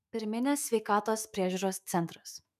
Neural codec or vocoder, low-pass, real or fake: autoencoder, 48 kHz, 128 numbers a frame, DAC-VAE, trained on Japanese speech; 14.4 kHz; fake